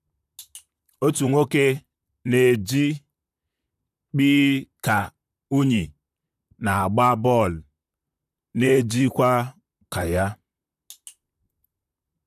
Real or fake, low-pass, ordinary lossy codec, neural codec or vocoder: fake; 14.4 kHz; none; vocoder, 44.1 kHz, 128 mel bands, Pupu-Vocoder